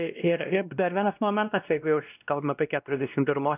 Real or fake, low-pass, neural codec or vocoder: fake; 3.6 kHz; codec, 16 kHz, 1 kbps, X-Codec, WavLM features, trained on Multilingual LibriSpeech